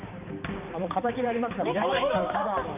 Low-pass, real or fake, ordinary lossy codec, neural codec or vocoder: 3.6 kHz; fake; none; codec, 16 kHz, 4 kbps, X-Codec, HuBERT features, trained on balanced general audio